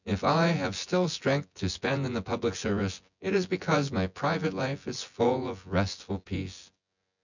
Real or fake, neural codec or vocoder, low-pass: fake; vocoder, 24 kHz, 100 mel bands, Vocos; 7.2 kHz